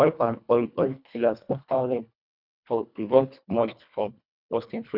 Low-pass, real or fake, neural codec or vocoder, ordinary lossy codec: 5.4 kHz; fake; codec, 24 kHz, 1.5 kbps, HILCodec; none